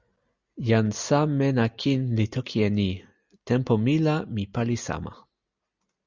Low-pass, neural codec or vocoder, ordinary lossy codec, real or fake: 7.2 kHz; none; Opus, 64 kbps; real